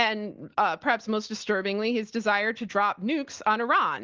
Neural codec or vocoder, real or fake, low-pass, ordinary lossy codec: none; real; 7.2 kHz; Opus, 16 kbps